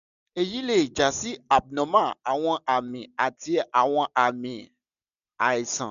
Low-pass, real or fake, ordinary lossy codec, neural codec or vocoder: 7.2 kHz; real; none; none